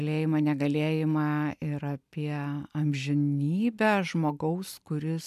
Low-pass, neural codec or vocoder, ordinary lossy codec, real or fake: 14.4 kHz; none; MP3, 96 kbps; real